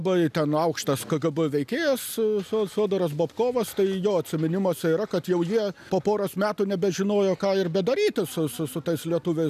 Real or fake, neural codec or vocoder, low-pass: real; none; 14.4 kHz